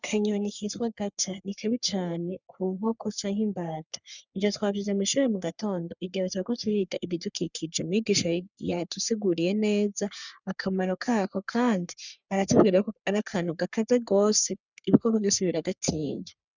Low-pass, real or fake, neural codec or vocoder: 7.2 kHz; fake; codec, 44.1 kHz, 3.4 kbps, Pupu-Codec